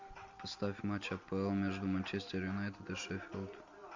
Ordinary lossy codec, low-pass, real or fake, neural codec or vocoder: MP3, 48 kbps; 7.2 kHz; real; none